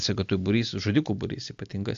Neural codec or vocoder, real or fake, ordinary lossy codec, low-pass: none; real; AAC, 64 kbps; 7.2 kHz